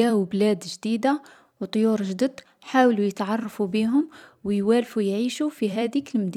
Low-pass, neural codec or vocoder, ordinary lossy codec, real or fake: 19.8 kHz; vocoder, 44.1 kHz, 128 mel bands every 256 samples, BigVGAN v2; none; fake